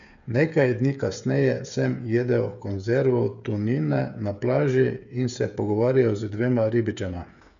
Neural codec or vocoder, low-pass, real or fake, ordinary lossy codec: codec, 16 kHz, 8 kbps, FreqCodec, smaller model; 7.2 kHz; fake; none